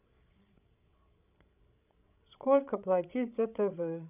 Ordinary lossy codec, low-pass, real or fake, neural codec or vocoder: none; 3.6 kHz; fake; codec, 16 kHz, 16 kbps, FreqCodec, larger model